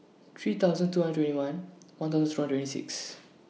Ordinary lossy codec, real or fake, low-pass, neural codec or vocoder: none; real; none; none